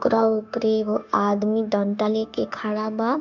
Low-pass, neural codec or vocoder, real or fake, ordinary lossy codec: 7.2 kHz; codec, 16 kHz, 0.9 kbps, LongCat-Audio-Codec; fake; none